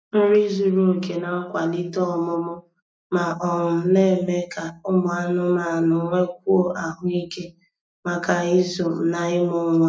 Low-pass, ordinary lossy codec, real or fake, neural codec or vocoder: none; none; real; none